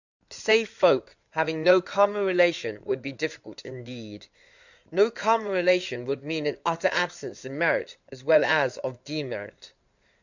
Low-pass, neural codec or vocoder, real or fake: 7.2 kHz; codec, 16 kHz in and 24 kHz out, 2.2 kbps, FireRedTTS-2 codec; fake